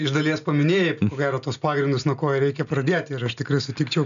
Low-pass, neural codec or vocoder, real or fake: 7.2 kHz; none; real